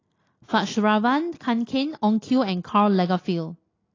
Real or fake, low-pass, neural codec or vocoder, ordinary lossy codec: real; 7.2 kHz; none; AAC, 32 kbps